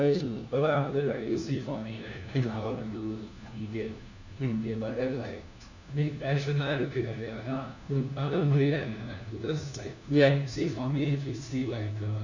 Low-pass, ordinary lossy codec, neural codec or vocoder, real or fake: 7.2 kHz; none; codec, 16 kHz, 1 kbps, FunCodec, trained on LibriTTS, 50 frames a second; fake